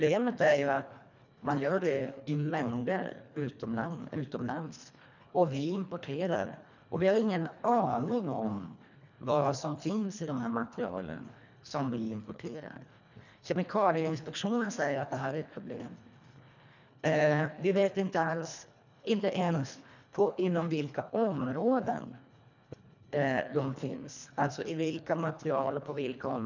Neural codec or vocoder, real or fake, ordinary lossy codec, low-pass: codec, 24 kHz, 1.5 kbps, HILCodec; fake; none; 7.2 kHz